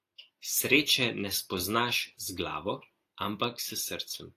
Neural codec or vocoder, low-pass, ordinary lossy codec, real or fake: none; 14.4 kHz; AAC, 64 kbps; real